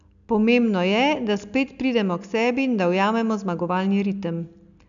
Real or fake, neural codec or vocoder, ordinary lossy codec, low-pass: real; none; none; 7.2 kHz